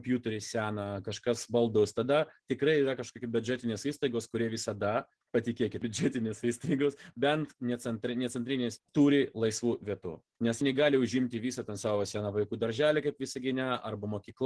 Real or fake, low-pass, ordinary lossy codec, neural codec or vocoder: real; 10.8 kHz; Opus, 16 kbps; none